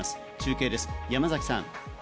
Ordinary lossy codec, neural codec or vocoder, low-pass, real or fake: none; none; none; real